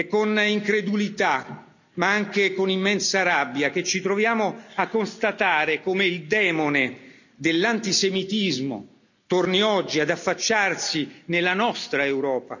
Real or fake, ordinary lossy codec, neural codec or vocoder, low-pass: real; none; none; 7.2 kHz